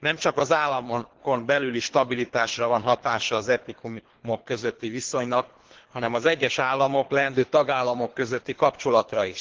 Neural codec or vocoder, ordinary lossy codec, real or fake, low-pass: codec, 24 kHz, 3 kbps, HILCodec; Opus, 16 kbps; fake; 7.2 kHz